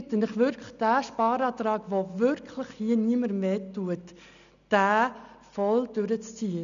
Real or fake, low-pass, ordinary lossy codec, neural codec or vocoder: real; 7.2 kHz; none; none